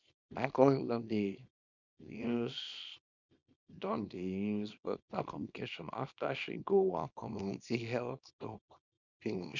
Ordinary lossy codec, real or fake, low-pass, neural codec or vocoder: none; fake; 7.2 kHz; codec, 24 kHz, 0.9 kbps, WavTokenizer, small release